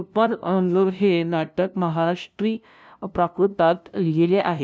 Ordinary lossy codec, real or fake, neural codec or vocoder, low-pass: none; fake; codec, 16 kHz, 0.5 kbps, FunCodec, trained on LibriTTS, 25 frames a second; none